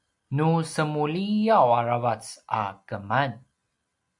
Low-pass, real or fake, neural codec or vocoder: 10.8 kHz; real; none